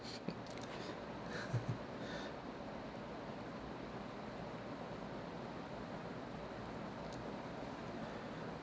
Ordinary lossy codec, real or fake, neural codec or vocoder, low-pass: none; real; none; none